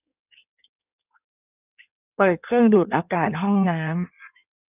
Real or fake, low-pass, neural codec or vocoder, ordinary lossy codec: fake; 3.6 kHz; codec, 16 kHz in and 24 kHz out, 1.1 kbps, FireRedTTS-2 codec; none